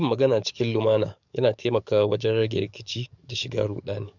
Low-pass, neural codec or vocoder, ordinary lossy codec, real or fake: 7.2 kHz; codec, 44.1 kHz, 7.8 kbps, DAC; none; fake